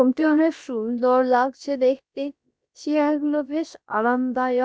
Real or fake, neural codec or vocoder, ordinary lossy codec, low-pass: fake; codec, 16 kHz, about 1 kbps, DyCAST, with the encoder's durations; none; none